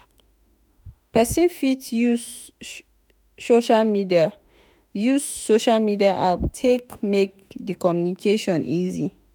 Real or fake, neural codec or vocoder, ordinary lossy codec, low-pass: fake; autoencoder, 48 kHz, 32 numbers a frame, DAC-VAE, trained on Japanese speech; none; none